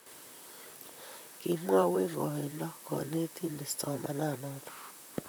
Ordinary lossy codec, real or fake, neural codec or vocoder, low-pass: none; fake; vocoder, 44.1 kHz, 128 mel bands, Pupu-Vocoder; none